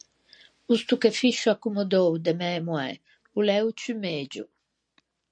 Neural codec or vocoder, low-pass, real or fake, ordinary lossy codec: none; 9.9 kHz; real; MP3, 48 kbps